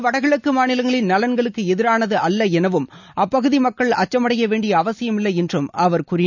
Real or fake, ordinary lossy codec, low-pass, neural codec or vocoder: real; none; 7.2 kHz; none